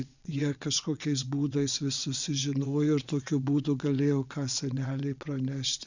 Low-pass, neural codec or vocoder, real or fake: 7.2 kHz; vocoder, 22.05 kHz, 80 mel bands, WaveNeXt; fake